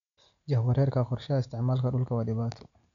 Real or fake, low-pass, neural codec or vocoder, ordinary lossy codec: real; 7.2 kHz; none; none